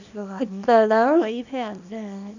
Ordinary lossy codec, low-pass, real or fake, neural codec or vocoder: none; 7.2 kHz; fake; codec, 24 kHz, 0.9 kbps, WavTokenizer, small release